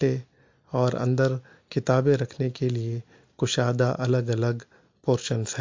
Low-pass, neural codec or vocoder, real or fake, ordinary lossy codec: 7.2 kHz; none; real; MP3, 48 kbps